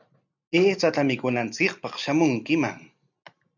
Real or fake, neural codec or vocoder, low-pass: real; none; 7.2 kHz